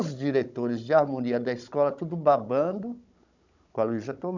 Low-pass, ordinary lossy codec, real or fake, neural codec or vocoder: 7.2 kHz; none; fake; codec, 16 kHz, 16 kbps, FunCodec, trained on Chinese and English, 50 frames a second